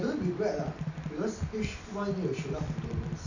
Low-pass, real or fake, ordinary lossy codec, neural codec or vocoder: 7.2 kHz; real; none; none